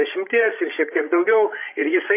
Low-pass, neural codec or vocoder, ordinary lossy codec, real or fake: 3.6 kHz; codec, 16 kHz, 16 kbps, FreqCodec, larger model; AAC, 24 kbps; fake